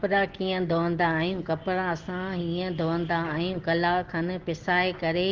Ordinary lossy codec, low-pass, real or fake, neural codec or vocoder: Opus, 16 kbps; 7.2 kHz; real; none